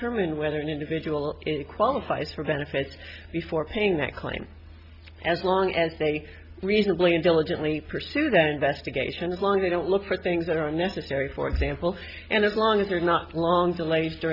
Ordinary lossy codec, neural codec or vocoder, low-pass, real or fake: Opus, 64 kbps; none; 5.4 kHz; real